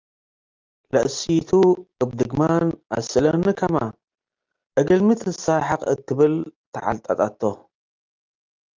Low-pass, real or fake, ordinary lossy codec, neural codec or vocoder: 7.2 kHz; real; Opus, 24 kbps; none